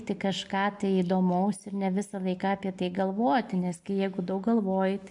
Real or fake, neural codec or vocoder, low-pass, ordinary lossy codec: real; none; 10.8 kHz; MP3, 64 kbps